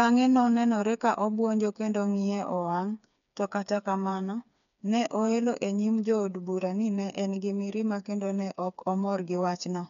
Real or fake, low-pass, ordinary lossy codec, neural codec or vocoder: fake; 7.2 kHz; none; codec, 16 kHz, 4 kbps, FreqCodec, smaller model